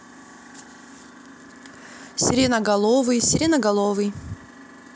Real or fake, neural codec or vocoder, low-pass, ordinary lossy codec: real; none; none; none